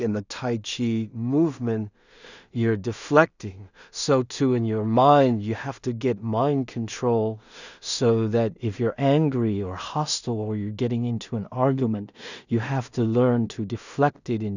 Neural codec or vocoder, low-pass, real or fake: codec, 16 kHz in and 24 kHz out, 0.4 kbps, LongCat-Audio-Codec, two codebook decoder; 7.2 kHz; fake